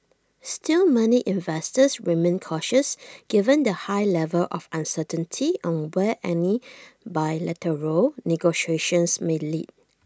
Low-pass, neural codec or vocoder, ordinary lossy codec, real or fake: none; none; none; real